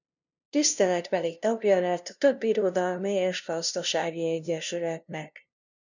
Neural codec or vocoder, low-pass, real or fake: codec, 16 kHz, 0.5 kbps, FunCodec, trained on LibriTTS, 25 frames a second; 7.2 kHz; fake